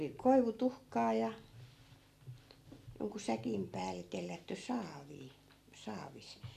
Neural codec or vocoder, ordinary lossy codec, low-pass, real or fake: none; none; 14.4 kHz; real